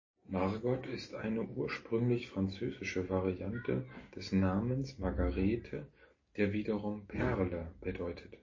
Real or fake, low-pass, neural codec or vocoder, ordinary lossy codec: real; 7.2 kHz; none; MP3, 32 kbps